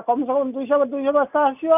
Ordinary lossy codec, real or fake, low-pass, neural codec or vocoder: none; real; 3.6 kHz; none